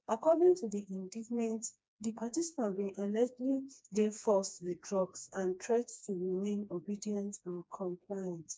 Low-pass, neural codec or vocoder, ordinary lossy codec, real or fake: none; codec, 16 kHz, 2 kbps, FreqCodec, smaller model; none; fake